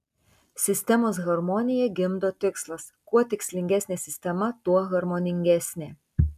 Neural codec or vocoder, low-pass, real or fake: none; 14.4 kHz; real